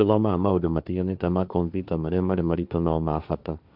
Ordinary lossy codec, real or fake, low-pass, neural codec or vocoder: none; fake; 5.4 kHz; codec, 16 kHz, 1.1 kbps, Voila-Tokenizer